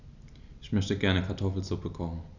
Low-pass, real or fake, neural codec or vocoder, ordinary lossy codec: 7.2 kHz; real; none; none